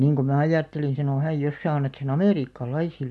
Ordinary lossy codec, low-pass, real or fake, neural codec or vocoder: none; none; real; none